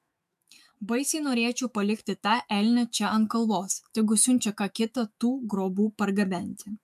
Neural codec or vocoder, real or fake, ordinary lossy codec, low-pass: autoencoder, 48 kHz, 128 numbers a frame, DAC-VAE, trained on Japanese speech; fake; MP3, 96 kbps; 14.4 kHz